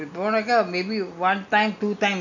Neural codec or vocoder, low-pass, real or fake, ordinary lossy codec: none; 7.2 kHz; real; none